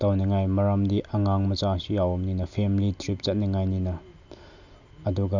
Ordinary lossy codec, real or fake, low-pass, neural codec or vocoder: none; real; 7.2 kHz; none